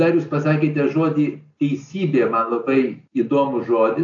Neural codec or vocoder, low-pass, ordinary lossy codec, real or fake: none; 7.2 kHz; AAC, 64 kbps; real